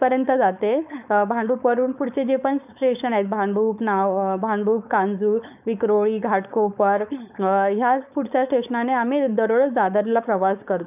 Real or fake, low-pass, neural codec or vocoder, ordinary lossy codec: fake; 3.6 kHz; codec, 16 kHz, 4.8 kbps, FACodec; none